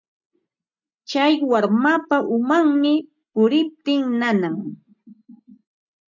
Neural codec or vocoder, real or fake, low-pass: none; real; 7.2 kHz